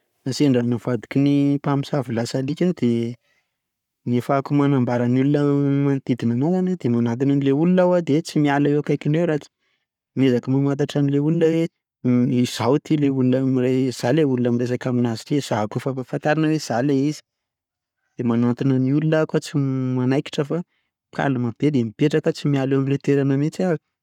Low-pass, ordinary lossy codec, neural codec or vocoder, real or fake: 19.8 kHz; none; codec, 44.1 kHz, 7.8 kbps, Pupu-Codec; fake